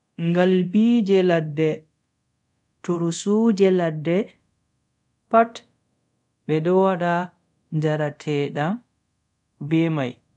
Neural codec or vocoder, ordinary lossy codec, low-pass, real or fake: codec, 24 kHz, 0.5 kbps, DualCodec; none; 10.8 kHz; fake